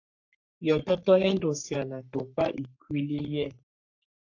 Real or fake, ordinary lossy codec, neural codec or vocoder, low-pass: fake; AAC, 48 kbps; codec, 44.1 kHz, 3.4 kbps, Pupu-Codec; 7.2 kHz